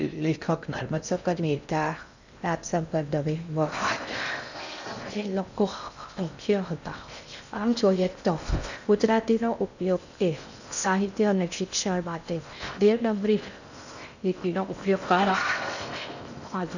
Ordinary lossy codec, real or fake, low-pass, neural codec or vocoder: none; fake; 7.2 kHz; codec, 16 kHz in and 24 kHz out, 0.6 kbps, FocalCodec, streaming, 4096 codes